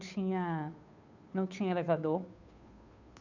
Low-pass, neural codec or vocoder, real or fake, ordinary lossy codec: 7.2 kHz; codec, 16 kHz, 2 kbps, FunCodec, trained on Chinese and English, 25 frames a second; fake; none